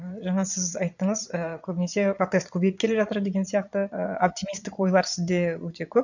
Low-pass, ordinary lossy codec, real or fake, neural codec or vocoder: 7.2 kHz; none; fake; vocoder, 22.05 kHz, 80 mel bands, Vocos